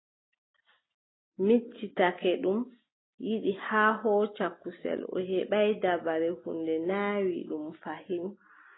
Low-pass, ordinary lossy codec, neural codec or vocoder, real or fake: 7.2 kHz; AAC, 16 kbps; none; real